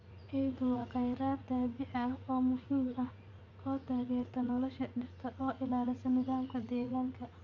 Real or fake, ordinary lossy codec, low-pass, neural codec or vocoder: fake; none; 7.2 kHz; vocoder, 44.1 kHz, 80 mel bands, Vocos